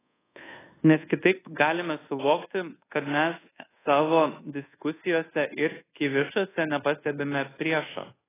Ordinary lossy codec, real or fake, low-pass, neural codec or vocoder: AAC, 16 kbps; fake; 3.6 kHz; codec, 24 kHz, 1.2 kbps, DualCodec